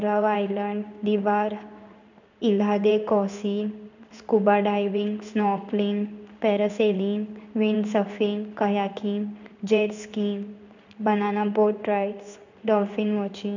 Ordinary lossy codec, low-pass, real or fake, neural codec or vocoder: none; 7.2 kHz; fake; codec, 16 kHz in and 24 kHz out, 1 kbps, XY-Tokenizer